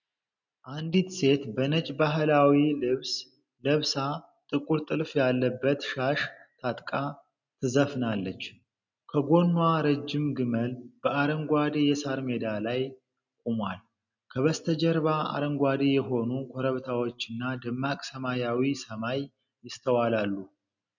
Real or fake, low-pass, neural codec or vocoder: real; 7.2 kHz; none